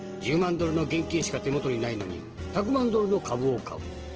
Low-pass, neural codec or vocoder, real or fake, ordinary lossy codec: 7.2 kHz; none; real; Opus, 16 kbps